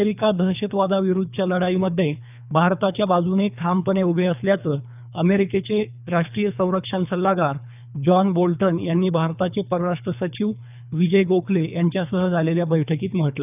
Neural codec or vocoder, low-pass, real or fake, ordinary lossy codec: codec, 24 kHz, 3 kbps, HILCodec; 3.6 kHz; fake; none